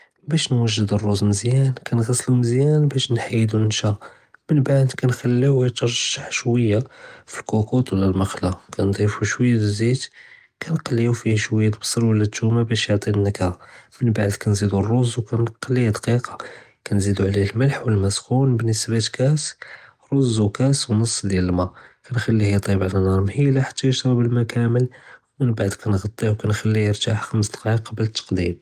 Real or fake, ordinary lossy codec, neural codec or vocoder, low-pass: real; Opus, 32 kbps; none; 14.4 kHz